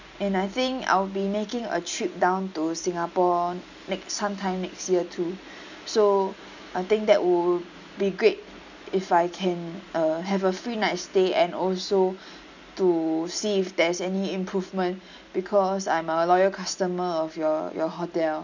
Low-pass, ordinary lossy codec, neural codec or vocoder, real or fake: 7.2 kHz; none; none; real